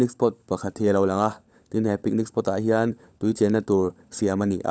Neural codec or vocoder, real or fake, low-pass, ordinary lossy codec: codec, 16 kHz, 16 kbps, FunCodec, trained on LibriTTS, 50 frames a second; fake; none; none